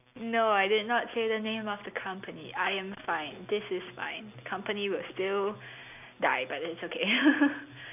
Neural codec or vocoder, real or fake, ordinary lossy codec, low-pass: none; real; none; 3.6 kHz